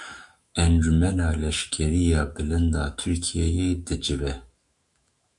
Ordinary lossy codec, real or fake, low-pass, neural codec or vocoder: Opus, 64 kbps; fake; 10.8 kHz; autoencoder, 48 kHz, 128 numbers a frame, DAC-VAE, trained on Japanese speech